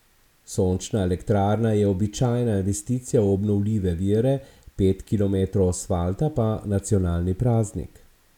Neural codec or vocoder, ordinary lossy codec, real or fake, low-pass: none; none; real; 19.8 kHz